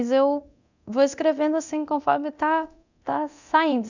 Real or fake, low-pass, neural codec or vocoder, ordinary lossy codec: fake; 7.2 kHz; codec, 24 kHz, 0.9 kbps, DualCodec; none